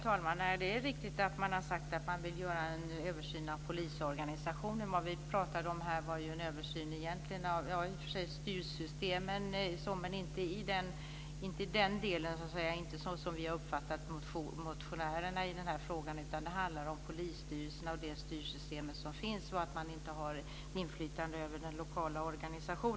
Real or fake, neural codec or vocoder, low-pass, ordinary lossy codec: real; none; none; none